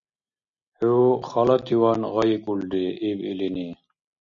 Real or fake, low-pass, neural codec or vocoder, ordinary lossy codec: real; 7.2 kHz; none; MP3, 96 kbps